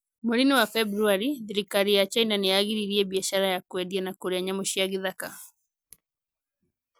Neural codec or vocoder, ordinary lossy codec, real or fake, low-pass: none; none; real; none